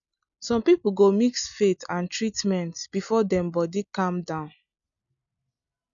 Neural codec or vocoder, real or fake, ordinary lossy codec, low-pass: none; real; none; 7.2 kHz